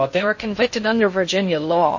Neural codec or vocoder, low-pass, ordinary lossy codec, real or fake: codec, 16 kHz in and 24 kHz out, 0.6 kbps, FocalCodec, streaming, 2048 codes; 7.2 kHz; MP3, 32 kbps; fake